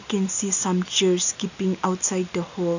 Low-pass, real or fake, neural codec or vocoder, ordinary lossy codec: 7.2 kHz; real; none; none